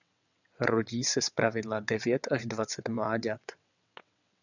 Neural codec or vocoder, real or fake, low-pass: vocoder, 22.05 kHz, 80 mel bands, WaveNeXt; fake; 7.2 kHz